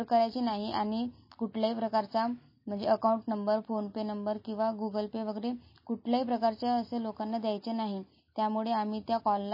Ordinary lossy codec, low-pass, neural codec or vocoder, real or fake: MP3, 24 kbps; 5.4 kHz; none; real